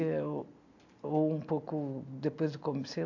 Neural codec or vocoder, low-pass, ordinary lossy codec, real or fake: none; 7.2 kHz; AAC, 48 kbps; real